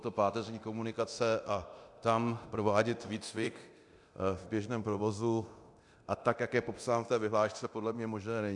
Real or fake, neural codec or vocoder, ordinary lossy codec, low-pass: fake; codec, 24 kHz, 0.9 kbps, DualCodec; AAC, 64 kbps; 10.8 kHz